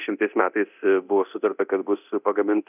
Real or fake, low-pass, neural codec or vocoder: fake; 3.6 kHz; codec, 24 kHz, 0.9 kbps, DualCodec